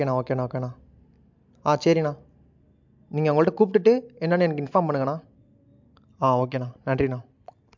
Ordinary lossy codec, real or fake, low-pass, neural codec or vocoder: MP3, 64 kbps; real; 7.2 kHz; none